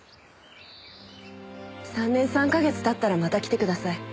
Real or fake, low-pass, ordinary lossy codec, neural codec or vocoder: real; none; none; none